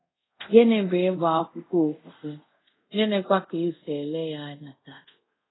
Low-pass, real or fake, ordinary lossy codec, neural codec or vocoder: 7.2 kHz; fake; AAC, 16 kbps; codec, 24 kHz, 0.5 kbps, DualCodec